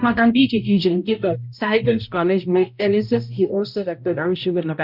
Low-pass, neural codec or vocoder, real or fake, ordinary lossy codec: 5.4 kHz; codec, 16 kHz, 0.5 kbps, X-Codec, HuBERT features, trained on balanced general audio; fake; none